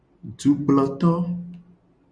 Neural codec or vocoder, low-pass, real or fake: none; 9.9 kHz; real